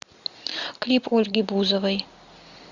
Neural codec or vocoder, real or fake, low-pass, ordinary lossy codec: none; real; 7.2 kHz; Opus, 64 kbps